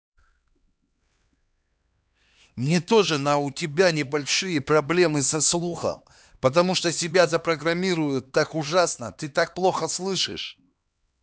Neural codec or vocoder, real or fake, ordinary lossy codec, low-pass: codec, 16 kHz, 2 kbps, X-Codec, HuBERT features, trained on LibriSpeech; fake; none; none